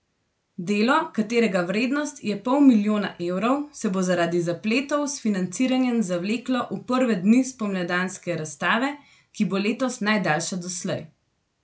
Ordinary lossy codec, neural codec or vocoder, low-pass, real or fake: none; none; none; real